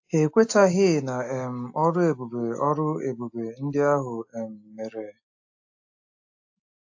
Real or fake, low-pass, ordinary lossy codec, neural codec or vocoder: real; 7.2 kHz; AAC, 48 kbps; none